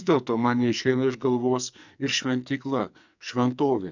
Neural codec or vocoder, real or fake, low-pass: codec, 44.1 kHz, 2.6 kbps, SNAC; fake; 7.2 kHz